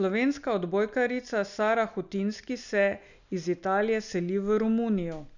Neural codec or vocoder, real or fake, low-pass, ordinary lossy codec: none; real; 7.2 kHz; none